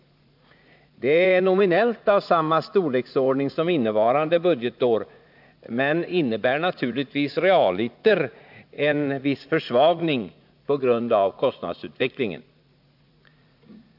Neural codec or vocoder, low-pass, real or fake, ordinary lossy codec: vocoder, 44.1 kHz, 80 mel bands, Vocos; 5.4 kHz; fake; AAC, 48 kbps